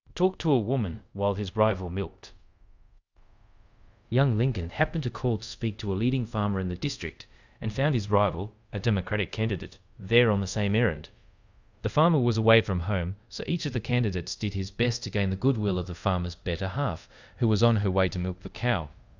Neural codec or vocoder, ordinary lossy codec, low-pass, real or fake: codec, 24 kHz, 0.5 kbps, DualCodec; Opus, 64 kbps; 7.2 kHz; fake